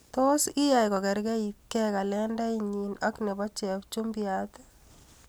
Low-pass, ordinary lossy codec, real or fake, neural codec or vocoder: none; none; real; none